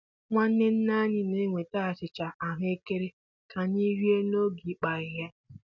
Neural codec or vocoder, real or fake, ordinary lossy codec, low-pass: none; real; none; 7.2 kHz